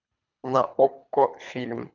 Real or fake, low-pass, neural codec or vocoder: fake; 7.2 kHz; codec, 24 kHz, 3 kbps, HILCodec